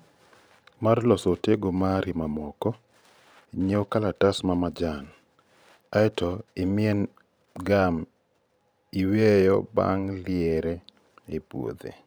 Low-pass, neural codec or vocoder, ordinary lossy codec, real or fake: none; vocoder, 44.1 kHz, 128 mel bands every 512 samples, BigVGAN v2; none; fake